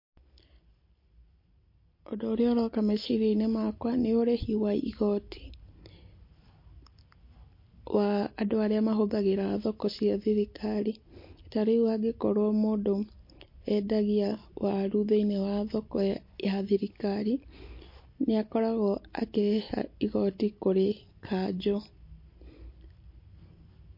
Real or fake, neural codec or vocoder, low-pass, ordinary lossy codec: real; none; 5.4 kHz; MP3, 32 kbps